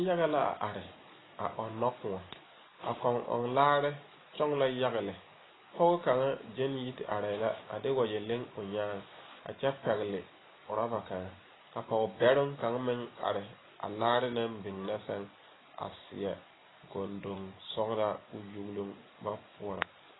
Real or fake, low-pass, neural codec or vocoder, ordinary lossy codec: real; 7.2 kHz; none; AAC, 16 kbps